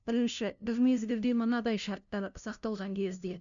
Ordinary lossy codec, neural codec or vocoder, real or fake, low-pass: none; codec, 16 kHz, 0.5 kbps, FunCodec, trained on LibriTTS, 25 frames a second; fake; 7.2 kHz